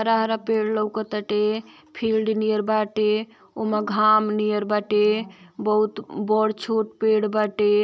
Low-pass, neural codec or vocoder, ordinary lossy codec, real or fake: none; none; none; real